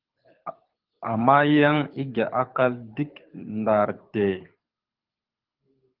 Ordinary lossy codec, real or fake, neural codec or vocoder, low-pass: Opus, 32 kbps; fake; codec, 24 kHz, 6 kbps, HILCodec; 5.4 kHz